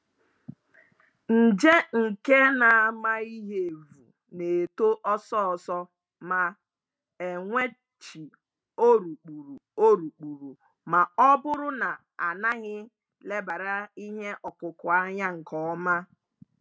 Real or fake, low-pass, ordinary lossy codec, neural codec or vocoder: real; none; none; none